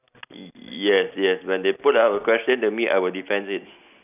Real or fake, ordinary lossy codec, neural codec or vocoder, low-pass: real; none; none; 3.6 kHz